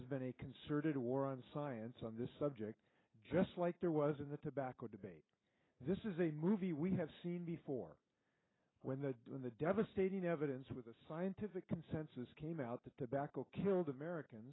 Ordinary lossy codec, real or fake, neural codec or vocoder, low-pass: AAC, 16 kbps; real; none; 7.2 kHz